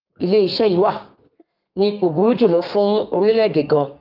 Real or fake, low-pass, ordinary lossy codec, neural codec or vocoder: fake; 5.4 kHz; Opus, 24 kbps; codec, 32 kHz, 1.9 kbps, SNAC